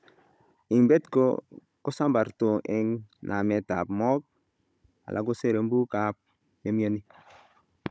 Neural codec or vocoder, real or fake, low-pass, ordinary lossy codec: codec, 16 kHz, 16 kbps, FunCodec, trained on Chinese and English, 50 frames a second; fake; none; none